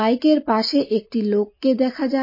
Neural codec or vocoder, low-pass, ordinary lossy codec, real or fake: none; 5.4 kHz; MP3, 24 kbps; real